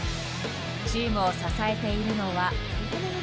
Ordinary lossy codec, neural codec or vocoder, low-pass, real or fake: none; none; none; real